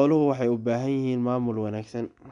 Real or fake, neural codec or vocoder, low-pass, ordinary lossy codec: real; none; 10.8 kHz; none